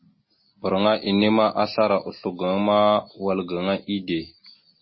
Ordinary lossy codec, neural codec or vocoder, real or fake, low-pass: MP3, 24 kbps; none; real; 7.2 kHz